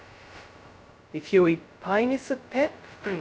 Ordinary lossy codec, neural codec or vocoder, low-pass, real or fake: none; codec, 16 kHz, 0.2 kbps, FocalCodec; none; fake